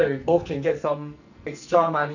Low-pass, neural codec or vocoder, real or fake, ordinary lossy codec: 7.2 kHz; codec, 44.1 kHz, 2.6 kbps, SNAC; fake; none